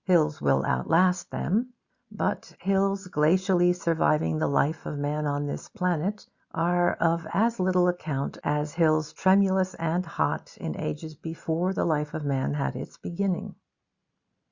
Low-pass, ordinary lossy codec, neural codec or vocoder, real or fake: 7.2 kHz; Opus, 64 kbps; none; real